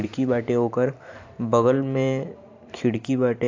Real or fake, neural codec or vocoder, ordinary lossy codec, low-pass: fake; vocoder, 44.1 kHz, 128 mel bands every 512 samples, BigVGAN v2; AAC, 48 kbps; 7.2 kHz